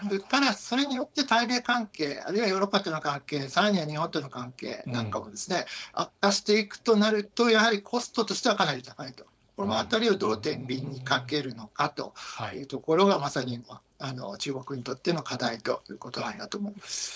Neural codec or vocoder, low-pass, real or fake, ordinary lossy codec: codec, 16 kHz, 4.8 kbps, FACodec; none; fake; none